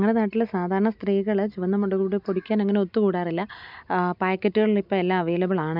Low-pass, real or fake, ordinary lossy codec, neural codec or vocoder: 5.4 kHz; real; none; none